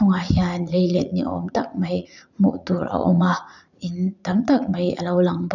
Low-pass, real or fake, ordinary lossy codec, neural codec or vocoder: 7.2 kHz; fake; none; vocoder, 44.1 kHz, 80 mel bands, Vocos